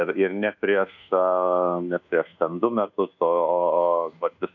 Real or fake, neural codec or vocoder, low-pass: fake; codec, 24 kHz, 1.2 kbps, DualCodec; 7.2 kHz